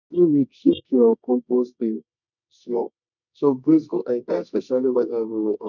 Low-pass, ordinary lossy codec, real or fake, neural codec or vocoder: 7.2 kHz; none; fake; codec, 24 kHz, 0.9 kbps, WavTokenizer, medium music audio release